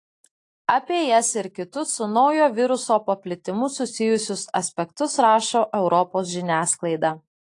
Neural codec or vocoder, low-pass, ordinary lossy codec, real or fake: none; 10.8 kHz; AAC, 48 kbps; real